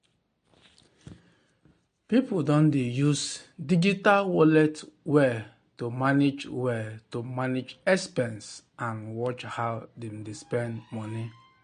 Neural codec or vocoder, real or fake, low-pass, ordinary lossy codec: none; real; 9.9 kHz; MP3, 48 kbps